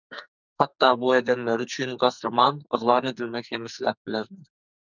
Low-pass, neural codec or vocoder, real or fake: 7.2 kHz; codec, 44.1 kHz, 2.6 kbps, SNAC; fake